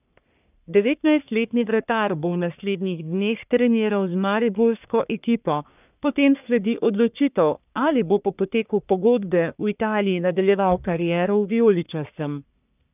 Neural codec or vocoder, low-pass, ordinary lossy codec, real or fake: codec, 44.1 kHz, 1.7 kbps, Pupu-Codec; 3.6 kHz; none; fake